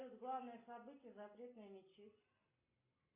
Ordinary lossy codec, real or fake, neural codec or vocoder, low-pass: MP3, 24 kbps; real; none; 3.6 kHz